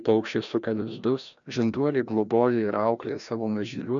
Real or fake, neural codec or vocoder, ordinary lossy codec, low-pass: fake; codec, 16 kHz, 1 kbps, FreqCodec, larger model; MP3, 96 kbps; 7.2 kHz